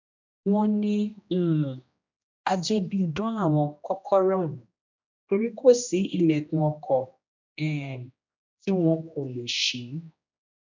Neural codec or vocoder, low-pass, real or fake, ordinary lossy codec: codec, 16 kHz, 1 kbps, X-Codec, HuBERT features, trained on general audio; 7.2 kHz; fake; MP3, 64 kbps